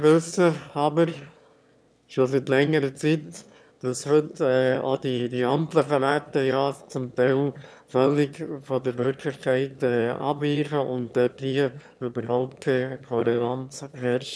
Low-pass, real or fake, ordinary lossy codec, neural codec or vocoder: none; fake; none; autoencoder, 22.05 kHz, a latent of 192 numbers a frame, VITS, trained on one speaker